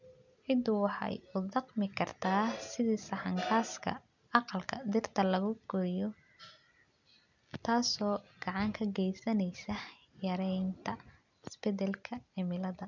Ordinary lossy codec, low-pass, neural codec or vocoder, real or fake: none; 7.2 kHz; none; real